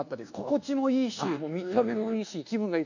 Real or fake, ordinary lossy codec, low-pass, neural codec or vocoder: fake; none; 7.2 kHz; codec, 24 kHz, 1.2 kbps, DualCodec